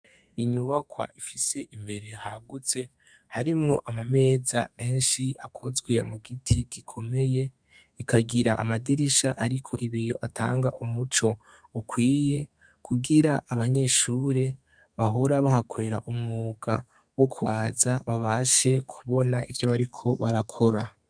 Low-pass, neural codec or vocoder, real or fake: 9.9 kHz; codec, 32 kHz, 1.9 kbps, SNAC; fake